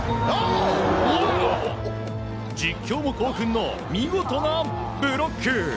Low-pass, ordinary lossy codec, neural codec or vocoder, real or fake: none; none; none; real